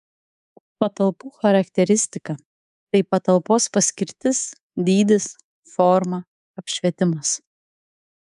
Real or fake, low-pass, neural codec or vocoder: fake; 10.8 kHz; codec, 24 kHz, 3.1 kbps, DualCodec